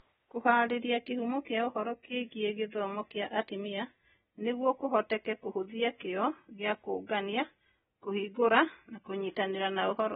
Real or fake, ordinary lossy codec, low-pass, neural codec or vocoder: real; AAC, 16 kbps; 7.2 kHz; none